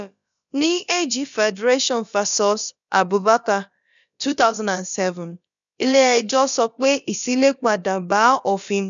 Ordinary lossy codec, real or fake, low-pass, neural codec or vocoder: none; fake; 7.2 kHz; codec, 16 kHz, about 1 kbps, DyCAST, with the encoder's durations